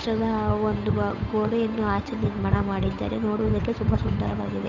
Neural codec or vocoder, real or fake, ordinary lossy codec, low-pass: codec, 16 kHz, 8 kbps, FunCodec, trained on Chinese and English, 25 frames a second; fake; none; 7.2 kHz